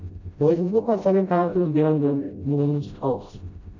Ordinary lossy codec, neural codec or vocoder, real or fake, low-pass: MP3, 64 kbps; codec, 16 kHz, 0.5 kbps, FreqCodec, smaller model; fake; 7.2 kHz